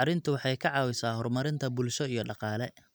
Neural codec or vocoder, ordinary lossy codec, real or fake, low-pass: none; none; real; none